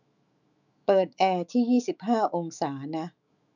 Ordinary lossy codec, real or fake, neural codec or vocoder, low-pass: none; fake; autoencoder, 48 kHz, 128 numbers a frame, DAC-VAE, trained on Japanese speech; 7.2 kHz